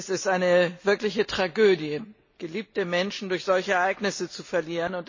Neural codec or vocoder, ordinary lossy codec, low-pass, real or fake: none; MP3, 32 kbps; 7.2 kHz; real